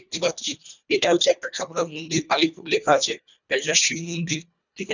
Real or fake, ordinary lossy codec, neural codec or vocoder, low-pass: fake; none; codec, 24 kHz, 1.5 kbps, HILCodec; 7.2 kHz